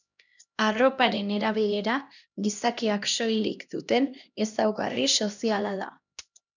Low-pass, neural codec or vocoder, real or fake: 7.2 kHz; codec, 16 kHz, 1 kbps, X-Codec, HuBERT features, trained on LibriSpeech; fake